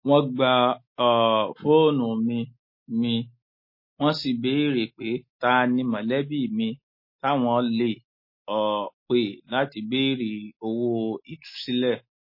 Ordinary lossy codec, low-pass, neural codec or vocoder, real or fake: MP3, 24 kbps; 5.4 kHz; none; real